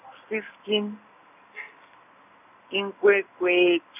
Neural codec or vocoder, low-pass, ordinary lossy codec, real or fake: none; 3.6 kHz; none; real